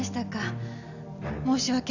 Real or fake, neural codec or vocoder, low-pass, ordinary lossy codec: real; none; 7.2 kHz; none